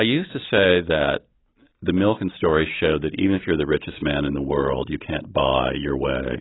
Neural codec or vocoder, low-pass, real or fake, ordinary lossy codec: none; 7.2 kHz; real; AAC, 16 kbps